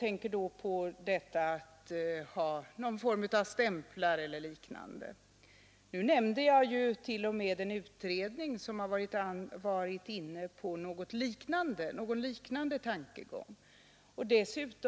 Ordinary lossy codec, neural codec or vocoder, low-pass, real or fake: none; none; none; real